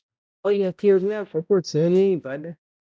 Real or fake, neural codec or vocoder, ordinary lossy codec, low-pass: fake; codec, 16 kHz, 0.5 kbps, X-Codec, HuBERT features, trained on balanced general audio; none; none